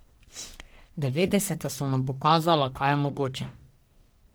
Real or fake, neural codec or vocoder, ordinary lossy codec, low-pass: fake; codec, 44.1 kHz, 1.7 kbps, Pupu-Codec; none; none